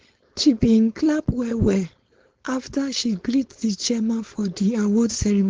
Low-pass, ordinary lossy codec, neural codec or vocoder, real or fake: 7.2 kHz; Opus, 16 kbps; codec, 16 kHz, 4.8 kbps, FACodec; fake